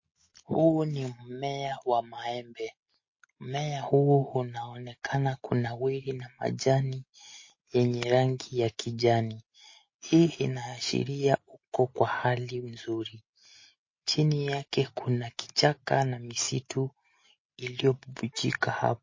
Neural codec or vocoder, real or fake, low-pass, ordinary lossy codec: none; real; 7.2 kHz; MP3, 32 kbps